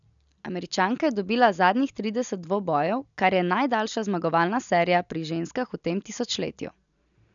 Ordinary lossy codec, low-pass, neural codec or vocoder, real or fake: none; 7.2 kHz; none; real